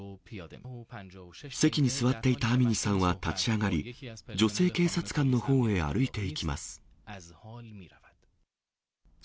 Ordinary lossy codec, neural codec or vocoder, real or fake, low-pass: none; none; real; none